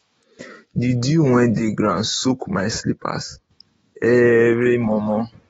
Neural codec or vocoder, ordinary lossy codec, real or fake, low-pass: autoencoder, 48 kHz, 128 numbers a frame, DAC-VAE, trained on Japanese speech; AAC, 24 kbps; fake; 19.8 kHz